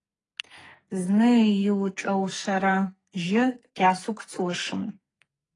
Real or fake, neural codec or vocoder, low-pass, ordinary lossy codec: fake; codec, 44.1 kHz, 2.6 kbps, SNAC; 10.8 kHz; AAC, 32 kbps